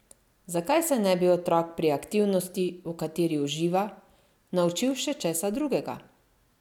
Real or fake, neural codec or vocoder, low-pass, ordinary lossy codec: real; none; 19.8 kHz; none